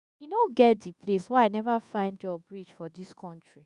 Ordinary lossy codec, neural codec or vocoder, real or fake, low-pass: Opus, 64 kbps; codec, 24 kHz, 1.2 kbps, DualCodec; fake; 10.8 kHz